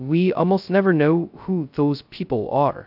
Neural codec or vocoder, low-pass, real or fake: codec, 16 kHz, 0.2 kbps, FocalCodec; 5.4 kHz; fake